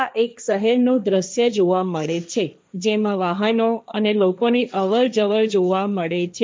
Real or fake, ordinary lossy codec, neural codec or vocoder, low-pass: fake; none; codec, 16 kHz, 1.1 kbps, Voila-Tokenizer; none